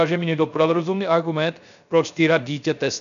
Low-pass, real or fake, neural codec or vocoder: 7.2 kHz; fake; codec, 16 kHz, 0.3 kbps, FocalCodec